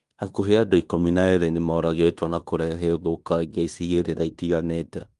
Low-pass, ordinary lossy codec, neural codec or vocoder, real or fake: 10.8 kHz; Opus, 32 kbps; codec, 16 kHz in and 24 kHz out, 0.9 kbps, LongCat-Audio-Codec, fine tuned four codebook decoder; fake